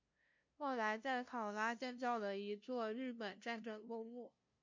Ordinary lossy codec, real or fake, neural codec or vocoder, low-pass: MP3, 48 kbps; fake; codec, 16 kHz, 0.5 kbps, FunCodec, trained on LibriTTS, 25 frames a second; 7.2 kHz